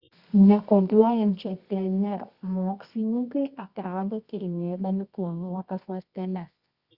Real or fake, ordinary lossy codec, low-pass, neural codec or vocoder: fake; Opus, 64 kbps; 5.4 kHz; codec, 24 kHz, 0.9 kbps, WavTokenizer, medium music audio release